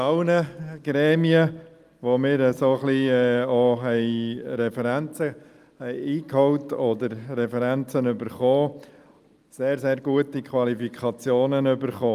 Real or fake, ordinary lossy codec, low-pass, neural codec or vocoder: real; Opus, 32 kbps; 14.4 kHz; none